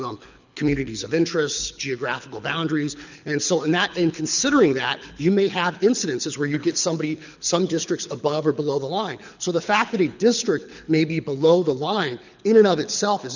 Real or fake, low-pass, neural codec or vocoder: fake; 7.2 kHz; codec, 24 kHz, 6 kbps, HILCodec